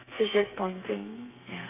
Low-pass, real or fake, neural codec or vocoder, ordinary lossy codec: 3.6 kHz; fake; codec, 32 kHz, 1.9 kbps, SNAC; none